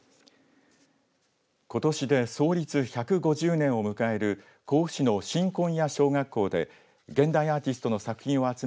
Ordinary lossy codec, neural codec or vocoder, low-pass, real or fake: none; none; none; real